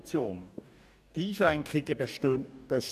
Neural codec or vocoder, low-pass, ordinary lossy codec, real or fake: codec, 44.1 kHz, 2.6 kbps, DAC; 14.4 kHz; none; fake